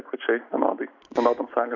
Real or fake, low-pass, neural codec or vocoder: real; 7.2 kHz; none